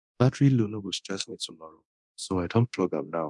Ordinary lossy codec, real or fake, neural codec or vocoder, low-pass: none; fake; codec, 24 kHz, 0.9 kbps, DualCodec; 10.8 kHz